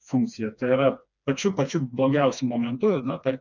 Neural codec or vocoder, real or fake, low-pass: codec, 16 kHz, 2 kbps, FreqCodec, smaller model; fake; 7.2 kHz